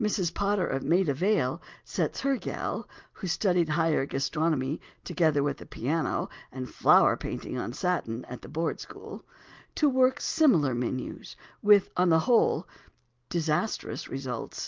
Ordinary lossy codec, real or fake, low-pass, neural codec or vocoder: Opus, 32 kbps; real; 7.2 kHz; none